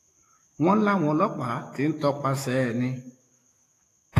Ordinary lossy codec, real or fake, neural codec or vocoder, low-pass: AAC, 48 kbps; fake; autoencoder, 48 kHz, 128 numbers a frame, DAC-VAE, trained on Japanese speech; 14.4 kHz